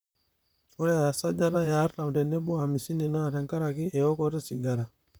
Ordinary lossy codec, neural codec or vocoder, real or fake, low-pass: none; vocoder, 44.1 kHz, 128 mel bands, Pupu-Vocoder; fake; none